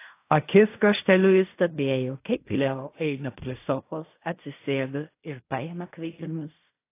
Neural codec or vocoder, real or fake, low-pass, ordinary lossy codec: codec, 16 kHz in and 24 kHz out, 0.4 kbps, LongCat-Audio-Codec, fine tuned four codebook decoder; fake; 3.6 kHz; AAC, 24 kbps